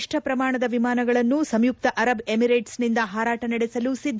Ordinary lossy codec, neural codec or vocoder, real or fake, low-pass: none; none; real; none